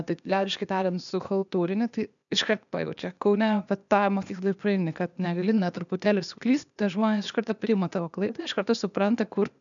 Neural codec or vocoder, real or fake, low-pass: codec, 16 kHz, 0.8 kbps, ZipCodec; fake; 7.2 kHz